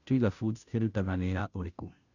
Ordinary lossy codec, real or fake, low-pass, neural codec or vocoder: none; fake; 7.2 kHz; codec, 16 kHz, 0.5 kbps, FunCodec, trained on Chinese and English, 25 frames a second